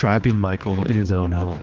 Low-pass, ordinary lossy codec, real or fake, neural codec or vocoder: 7.2 kHz; Opus, 32 kbps; fake; codec, 16 kHz, 2 kbps, X-Codec, HuBERT features, trained on general audio